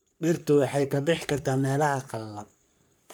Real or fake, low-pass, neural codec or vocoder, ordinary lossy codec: fake; none; codec, 44.1 kHz, 3.4 kbps, Pupu-Codec; none